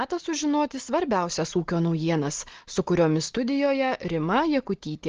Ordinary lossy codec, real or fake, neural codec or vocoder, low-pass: Opus, 16 kbps; real; none; 7.2 kHz